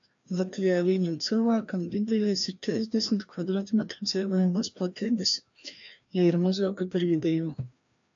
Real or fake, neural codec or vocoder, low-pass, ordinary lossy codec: fake; codec, 16 kHz, 1 kbps, FreqCodec, larger model; 7.2 kHz; AAC, 64 kbps